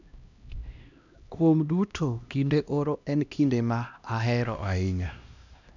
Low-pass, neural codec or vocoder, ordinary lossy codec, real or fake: 7.2 kHz; codec, 16 kHz, 1 kbps, X-Codec, HuBERT features, trained on LibriSpeech; none; fake